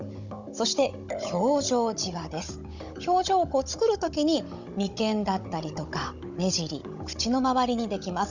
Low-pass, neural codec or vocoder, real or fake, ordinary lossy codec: 7.2 kHz; codec, 16 kHz, 16 kbps, FunCodec, trained on Chinese and English, 50 frames a second; fake; none